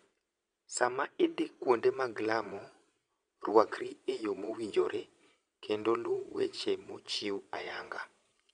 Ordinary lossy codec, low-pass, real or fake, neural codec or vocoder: none; 9.9 kHz; fake; vocoder, 22.05 kHz, 80 mel bands, Vocos